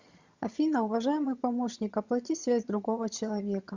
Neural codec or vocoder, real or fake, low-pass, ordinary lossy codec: vocoder, 22.05 kHz, 80 mel bands, HiFi-GAN; fake; 7.2 kHz; Opus, 64 kbps